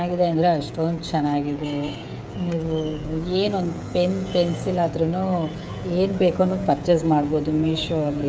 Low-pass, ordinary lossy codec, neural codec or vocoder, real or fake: none; none; codec, 16 kHz, 16 kbps, FreqCodec, smaller model; fake